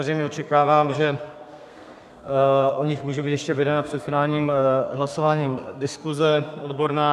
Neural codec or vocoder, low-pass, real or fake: codec, 32 kHz, 1.9 kbps, SNAC; 14.4 kHz; fake